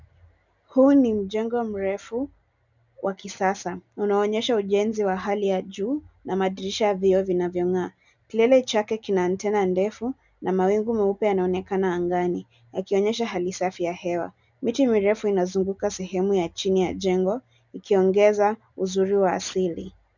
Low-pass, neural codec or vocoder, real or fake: 7.2 kHz; none; real